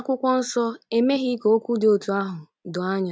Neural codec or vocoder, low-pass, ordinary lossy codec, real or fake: none; none; none; real